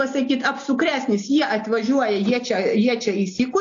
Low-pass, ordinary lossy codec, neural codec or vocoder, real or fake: 7.2 kHz; AAC, 48 kbps; none; real